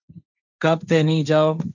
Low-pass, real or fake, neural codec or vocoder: 7.2 kHz; fake; codec, 16 kHz, 1.1 kbps, Voila-Tokenizer